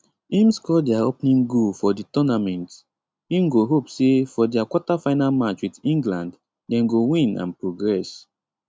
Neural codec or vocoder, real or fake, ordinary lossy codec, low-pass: none; real; none; none